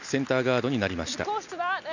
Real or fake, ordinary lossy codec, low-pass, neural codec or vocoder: real; none; 7.2 kHz; none